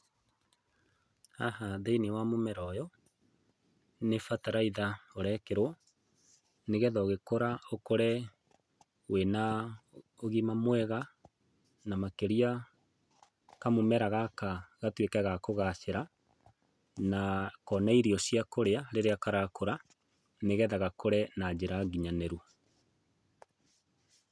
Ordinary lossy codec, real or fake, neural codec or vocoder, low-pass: none; real; none; none